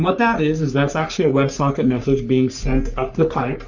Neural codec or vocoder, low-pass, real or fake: codec, 44.1 kHz, 3.4 kbps, Pupu-Codec; 7.2 kHz; fake